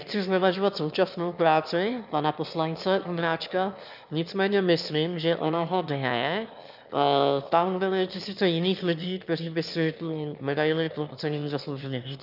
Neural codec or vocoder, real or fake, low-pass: autoencoder, 22.05 kHz, a latent of 192 numbers a frame, VITS, trained on one speaker; fake; 5.4 kHz